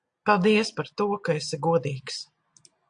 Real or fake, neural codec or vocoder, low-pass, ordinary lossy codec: real; none; 9.9 kHz; AAC, 64 kbps